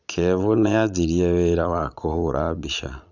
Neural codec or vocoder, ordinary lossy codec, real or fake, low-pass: vocoder, 22.05 kHz, 80 mel bands, Vocos; none; fake; 7.2 kHz